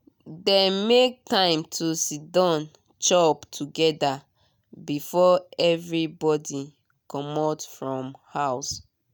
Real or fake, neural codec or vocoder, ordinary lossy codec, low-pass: real; none; none; none